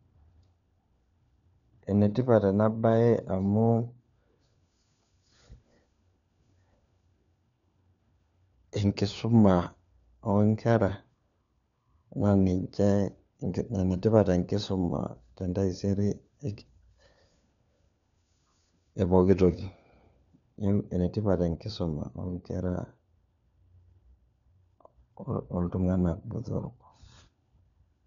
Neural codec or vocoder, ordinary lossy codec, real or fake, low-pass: codec, 16 kHz, 4 kbps, FunCodec, trained on LibriTTS, 50 frames a second; none; fake; 7.2 kHz